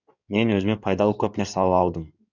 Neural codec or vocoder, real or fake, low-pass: codec, 16 kHz in and 24 kHz out, 2.2 kbps, FireRedTTS-2 codec; fake; 7.2 kHz